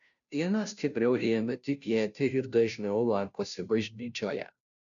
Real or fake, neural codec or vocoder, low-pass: fake; codec, 16 kHz, 0.5 kbps, FunCodec, trained on Chinese and English, 25 frames a second; 7.2 kHz